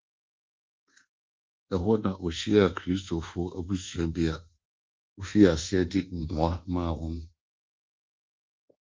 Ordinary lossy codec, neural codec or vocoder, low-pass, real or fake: Opus, 24 kbps; codec, 24 kHz, 1.2 kbps, DualCodec; 7.2 kHz; fake